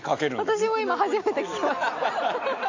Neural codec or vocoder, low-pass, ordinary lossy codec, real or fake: none; 7.2 kHz; AAC, 48 kbps; real